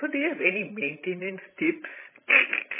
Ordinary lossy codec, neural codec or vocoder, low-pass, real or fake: MP3, 16 kbps; codec, 16 kHz, 16 kbps, FreqCodec, larger model; 3.6 kHz; fake